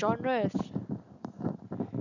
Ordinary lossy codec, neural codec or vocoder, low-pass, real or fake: none; none; 7.2 kHz; real